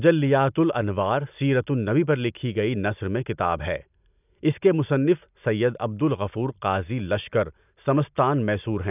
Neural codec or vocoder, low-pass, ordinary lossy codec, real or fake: none; 3.6 kHz; none; real